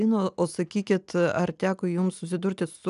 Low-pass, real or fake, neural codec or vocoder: 10.8 kHz; real; none